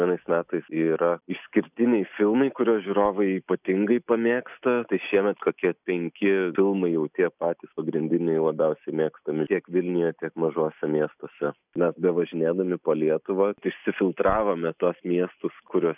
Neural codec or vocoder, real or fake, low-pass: none; real; 3.6 kHz